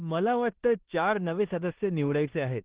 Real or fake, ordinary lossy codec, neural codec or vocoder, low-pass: fake; Opus, 16 kbps; codec, 16 kHz in and 24 kHz out, 0.9 kbps, LongCat-Audio-Codec, four codebook decoder; 3.6 kHz